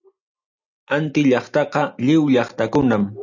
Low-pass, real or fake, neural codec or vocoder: 7.2 kHz; real; none